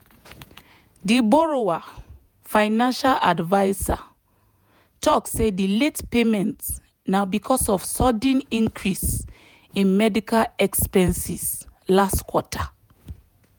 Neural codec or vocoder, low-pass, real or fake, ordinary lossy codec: vocoder, 48 kHz, 128 mel bands, Vocos; none; fake; none